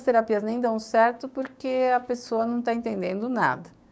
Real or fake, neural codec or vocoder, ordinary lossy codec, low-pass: fake; codec, 16 kHz, 6 kbps, DAC; none; none